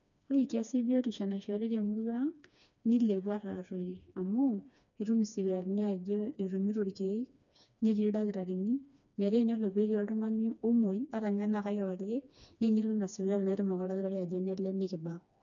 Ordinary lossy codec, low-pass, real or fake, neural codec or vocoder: none; 7.2 kHz; fake; codec, 16 kHz, 2 kbps, FreqCodec, smaller model